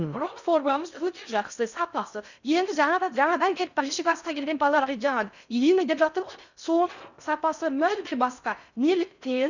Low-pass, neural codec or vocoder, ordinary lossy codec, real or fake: 7.2 kHz; codec, 16 kHz in and 24 kHz out, 0.6 kbps, FocalCodec, streaming, 2048 codes; none; fake